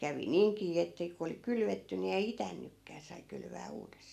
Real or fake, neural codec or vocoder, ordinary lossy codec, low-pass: real; none; none; 14.4 kHz